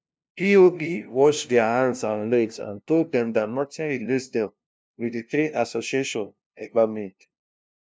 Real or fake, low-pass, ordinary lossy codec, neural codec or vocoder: fake; none; none; codec, 16 kHz, 0.5 kbps, FunCodec, trained on LibriTTS, 25 frames a second